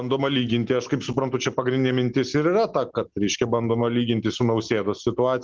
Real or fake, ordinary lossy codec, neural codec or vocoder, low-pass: real; Opus, 16 kbps; none; 7.2 kHz